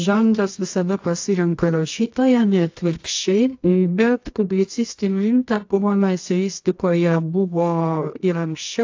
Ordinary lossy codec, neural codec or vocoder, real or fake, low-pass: AAC, 48 kbps; codec, 24 kHz, 0.9 kbps, WavTokenizer, medium music audio release; fake; 7.2 kHz